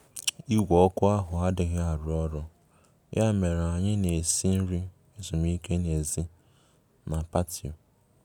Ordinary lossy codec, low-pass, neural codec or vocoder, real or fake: none; none; none; real